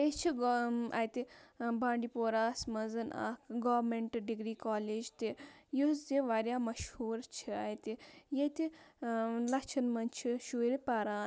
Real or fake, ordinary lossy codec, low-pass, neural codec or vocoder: real; none; none; none